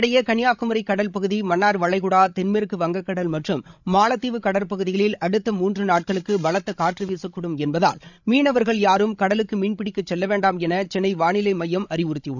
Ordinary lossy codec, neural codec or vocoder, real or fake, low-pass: none; codec, 16 kHz, 16 kbps, FreqCodec, larger model; fake; 7.2 kHz